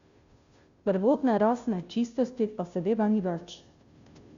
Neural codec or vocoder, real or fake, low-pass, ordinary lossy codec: codec, 16 kHz, 0.5 kbps, FunCodec, trained on Chinese and English, 25 frames a second; fake; 7.2 kHz; Opus, 64 kbps